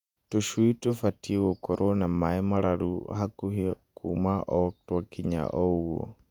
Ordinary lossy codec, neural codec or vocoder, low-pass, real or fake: none; vocoder, 48 kHz, 128 mel bands, Vocos; 19.8 kHz; fake